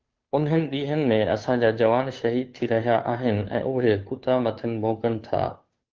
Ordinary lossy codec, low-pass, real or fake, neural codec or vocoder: Opus, 32 kbps; 7.2 kHz; fake; codec, 16 kHz, 2 kbps, FunCodec, trained on Chinese and English, 25 frames a second